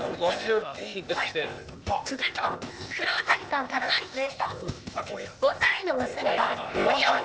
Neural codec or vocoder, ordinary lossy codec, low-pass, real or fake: codec, 16 kHz, 0.8 kbps, ZipCodec; none; none; fake